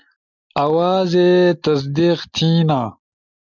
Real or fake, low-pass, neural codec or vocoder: real; 7.2 kHz; none